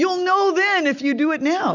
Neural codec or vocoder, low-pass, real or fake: none; 7.2 kHz; real